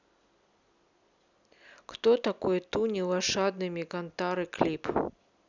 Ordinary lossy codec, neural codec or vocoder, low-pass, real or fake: none; none; 7.2 kHz; real